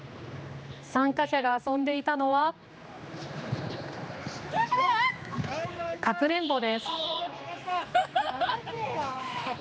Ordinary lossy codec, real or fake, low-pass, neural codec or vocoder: none; fake; none; codec, 16 kHz, 2 kbps, X-Codec, HuBERT features, trained on general audio